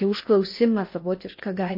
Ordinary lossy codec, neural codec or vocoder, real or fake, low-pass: MP3, 32 kbps; codec, 16 kHz in and 24 kHz out, 0.8 kbps, FocalCodec, streaming, 65536 codes; fake; 5.4 kHz